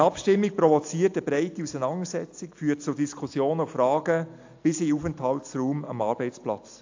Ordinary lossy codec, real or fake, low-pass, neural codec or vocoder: AAC, 48 kbps; real; 7.2 kHz; none